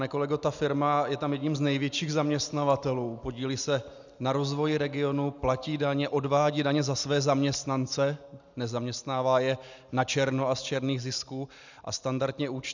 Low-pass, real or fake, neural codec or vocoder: 7.2 kHz; real; none